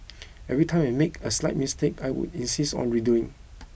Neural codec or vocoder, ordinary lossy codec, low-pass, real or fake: none; none; none; real